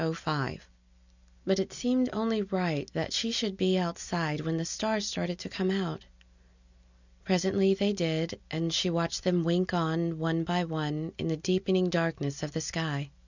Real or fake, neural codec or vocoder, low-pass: real; none; 7.2 kHz